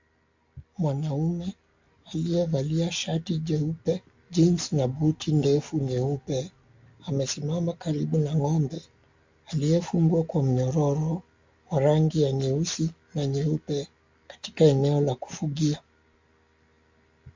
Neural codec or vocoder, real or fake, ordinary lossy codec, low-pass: none; real; MP3, 48 kbps; 7.2 kHz